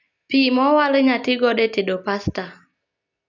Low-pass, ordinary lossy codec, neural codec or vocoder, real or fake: 7.2 kHz; none; none; real